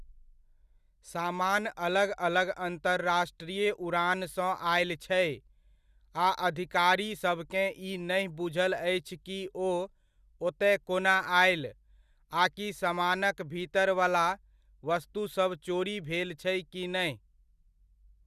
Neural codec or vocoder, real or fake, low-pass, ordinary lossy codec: none; real; 14.4 kHz; Opus, 64 kbps